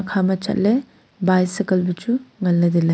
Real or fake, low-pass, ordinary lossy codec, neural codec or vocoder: real; none; none; none